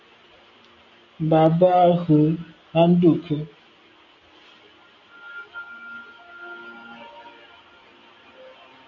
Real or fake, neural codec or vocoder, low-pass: real; none; 7.2 kHz